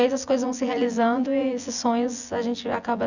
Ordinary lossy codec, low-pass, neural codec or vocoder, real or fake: none; 7.2 kHz; vocoder, 24 kHz, 100 mel bands, Vocos; fake